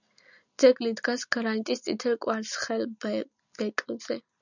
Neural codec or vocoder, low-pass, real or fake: none; 7.2 kHz; real